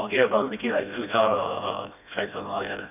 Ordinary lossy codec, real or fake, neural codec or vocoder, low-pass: none; fake; codec, 16 kHz, 1 kbps, FreqCodec, smaller model; 3.6 kHz